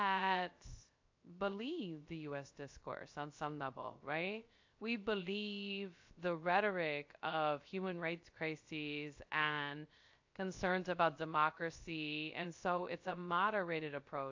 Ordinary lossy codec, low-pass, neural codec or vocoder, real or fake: AAC, 48 kbps; 7.2 kHz; codec, 16 kHz, 0.3 kbps, FocalCodec; fake